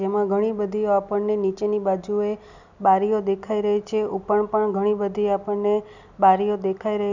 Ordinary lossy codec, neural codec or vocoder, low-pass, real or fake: none; none; 7.2 kHz; real